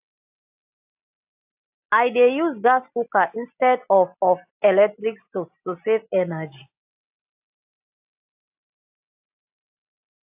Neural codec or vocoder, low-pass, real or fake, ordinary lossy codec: none; 3.6 kHz; real; AAC, 32 kbps